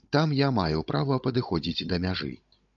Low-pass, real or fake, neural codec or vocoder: 7.2 kHz; fake; codec, 16 kHz, 16 kbps, FunCodec, trained on Chinese and English, 50 frames a second